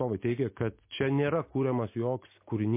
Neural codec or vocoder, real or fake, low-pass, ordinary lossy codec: none; real; 3.6 kHz; MP3, 24 kbps